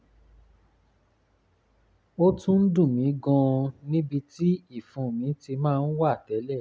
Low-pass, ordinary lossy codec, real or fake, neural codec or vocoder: none; none; real; none